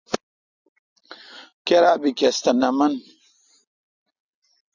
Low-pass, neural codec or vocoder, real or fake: 7.2 kHz; none; real